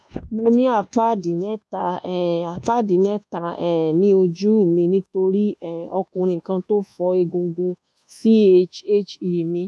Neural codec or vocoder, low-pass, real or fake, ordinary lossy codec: codec, 24 kHz, 1.2 kbps, DualCodec; none; fake; none